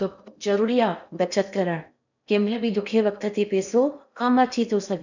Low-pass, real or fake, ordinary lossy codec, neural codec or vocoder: 7.2 kHz; fake; none; codec, 16 kHz in and 24 kHz out, 0.8 kbps, FocalCodec, streaming, 65536 codes